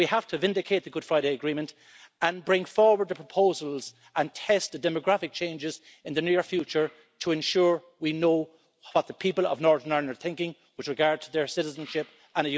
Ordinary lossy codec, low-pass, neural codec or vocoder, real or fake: none; none; none; real